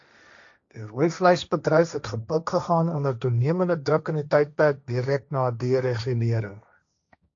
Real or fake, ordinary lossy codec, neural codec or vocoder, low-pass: fake; AAC, 64 kbps; codec, 16 kHz, 1.1 kbps, Voila-Tokenizer; 7.2 kHz